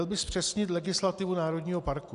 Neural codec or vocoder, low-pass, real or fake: none; 10.8 kHz; real